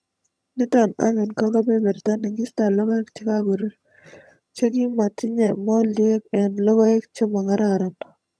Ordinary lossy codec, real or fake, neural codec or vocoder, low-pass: none; fake; vocoder, 22.05 kHz, 80 mel bands, HiFi-GAN; none